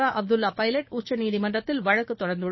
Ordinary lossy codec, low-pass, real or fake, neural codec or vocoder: MP3, 24 kbps; 7.2 kHz; fake; codec, 16 kHz, 6 kbps, DAC